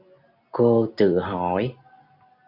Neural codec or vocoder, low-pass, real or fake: none; 5.4 kHz; real